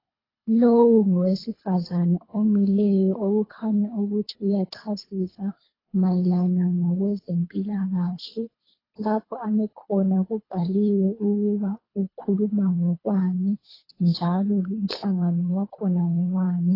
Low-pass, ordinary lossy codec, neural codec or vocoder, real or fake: 5.4 kHz; AAC, 24 kbps; codec, 24 kHz, 3 kbps, HILCodec; fake